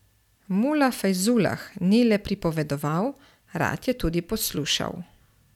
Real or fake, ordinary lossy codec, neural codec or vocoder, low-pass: real; none; none; 19.8 kHz